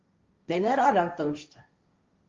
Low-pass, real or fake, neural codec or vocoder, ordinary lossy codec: 7.2 kHz; fake; codec, 16 kHz, 1.1 kbps, Voila-Tokenizer; Opus, 32 kbps